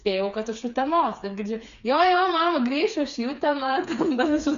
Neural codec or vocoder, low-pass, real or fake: codec, 16 kHz, 4 kbps, FreqCodec, smaller model; 7.2 kHz; fake